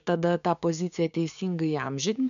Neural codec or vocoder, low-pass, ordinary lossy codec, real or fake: codec, 16 kHz, 6 kbps, DAC; 7.2 kHz; MP3, 96 kbps; fake